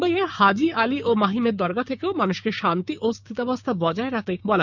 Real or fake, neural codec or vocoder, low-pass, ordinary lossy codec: fake; codec, 16 kHz, 6 kbps, DAC; 7.2 kHz; none